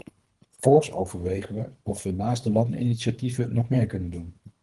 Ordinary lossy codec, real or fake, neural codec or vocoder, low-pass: Opus, 24 kbps; fake; codec, 24 kHz, 3 kbps, HILCodec; 10.8 kHz